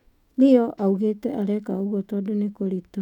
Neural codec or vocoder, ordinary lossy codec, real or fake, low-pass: autoencoder, 48 kHz, 128 numbers a frame, DAC-VAE, trained on Japanese speech; none; fake; 19.8 kHz